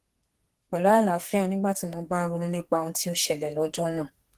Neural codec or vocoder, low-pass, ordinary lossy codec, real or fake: codec, 32 kHz, 1.9 kbps, SNAC; 14.4 kHz; Opus, 16 kbps; fake